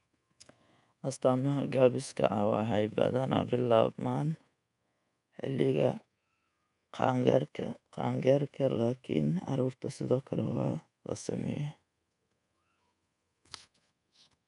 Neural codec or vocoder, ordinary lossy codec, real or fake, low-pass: codec, 24 kHz, 1.2 kbps, DualCodec; none; fake; 10.8 kHz